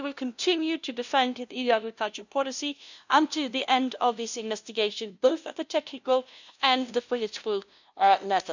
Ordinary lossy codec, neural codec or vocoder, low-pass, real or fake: none; codec, 16 kHz, 0.5 kbps, FunCodec, trained on LibriTTS, 25 frames a second; 7.2 kHz; fake